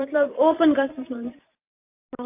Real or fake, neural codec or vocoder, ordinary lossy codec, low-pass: fake; vocoder, 44.1 kHz, 128 mel bands every 512 samples, BigVGAN v2; AAC, 24 kbps; 3.6 kHz